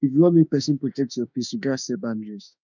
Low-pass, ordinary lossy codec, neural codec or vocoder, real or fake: 7.2 kHz; none; autoencoder, 48 kHz, 32 numbers a frame, DAC-VAE, trained on Japanese speech; fake